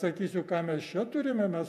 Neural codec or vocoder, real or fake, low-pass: vocoder, 48 kHz, 128 mel bands, Vocos; fake; 14.4 kHz